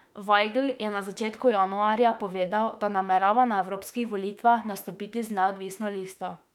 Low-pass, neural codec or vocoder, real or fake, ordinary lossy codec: 19.8 kHz; autoencoder, 48 kHz, 32 numbers a frame, DAC-VAE, trained on Japanese speech; fake; none